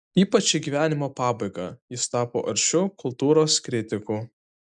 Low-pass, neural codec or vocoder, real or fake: 10.8 kHz; none; real